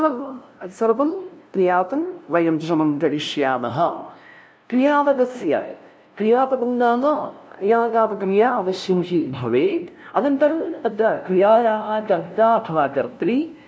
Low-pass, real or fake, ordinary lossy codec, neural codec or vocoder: none; fake; none; codec, 16 kHz, 0.5 kbps, FunCodec, trained on LibriTTS, 25 frames a second